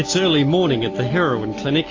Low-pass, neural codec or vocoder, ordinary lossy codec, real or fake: 7.2 kHz; none; AAC, 32 kbps; real